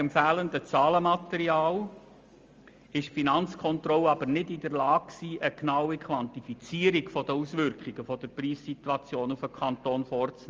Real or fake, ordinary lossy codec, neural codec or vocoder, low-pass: real; Opus, 32 kbps; none; 7.2 kHz